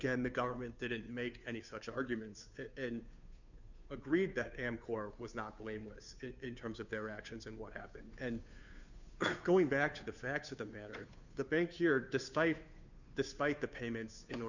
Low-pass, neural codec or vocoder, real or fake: 7.2 kHz; codec, 16 kHz, 2 kbps, FunCodec, trained on Chinese and English, 25 frames a second; fake